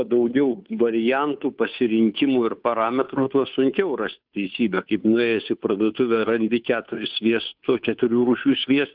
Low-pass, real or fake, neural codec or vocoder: 5.4 kHz; fake; codec, 16 kHz, 2 kbps, FunCodec, trained on Chinese and English, 25 frames a second